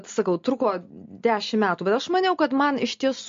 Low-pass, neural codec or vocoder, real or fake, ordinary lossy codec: 7.2 kHz; none; real; MP3, 48 kbps